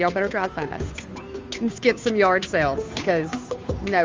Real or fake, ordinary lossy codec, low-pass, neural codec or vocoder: fake; Opus, 32 kbps; 7.2 kHz; codec, 16 kHz, 2 kbps, FunCodec, trained on Chinese and English, 25 frames a second